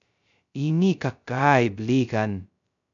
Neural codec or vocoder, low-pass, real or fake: codec, 16 kHz, 0.2 kbps, FocalCodec; 7.2 kHz; fake